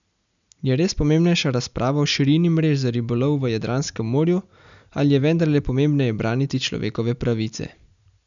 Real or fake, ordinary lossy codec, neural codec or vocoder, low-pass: real; none; none; 7.2 kHz